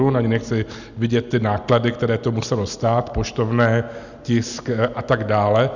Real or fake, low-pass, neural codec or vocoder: real; 7.2 kHz; none